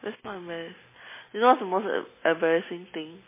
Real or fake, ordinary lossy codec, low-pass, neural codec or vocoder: real; MP3, 16 kbps; 3.6 kHz; none